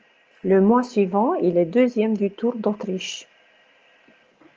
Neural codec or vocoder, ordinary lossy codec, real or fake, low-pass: none; Opus, 24 kbps; real; 7.2 kHz